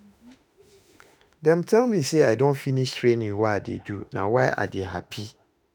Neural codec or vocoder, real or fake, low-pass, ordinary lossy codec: autoencoder, 48 kHz, 32 numbers a frame, DAC-VAE, trained on Japanese speech; fake; none; none